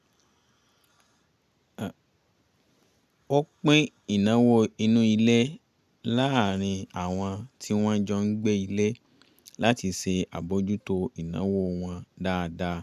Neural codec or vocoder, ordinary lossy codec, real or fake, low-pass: none; none; real; 14.4 kHz